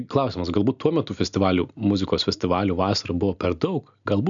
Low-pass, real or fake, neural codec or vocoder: 7.2 kHz; real; none